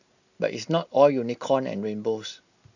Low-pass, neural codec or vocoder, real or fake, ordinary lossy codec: 7.2 kHz; none; real; none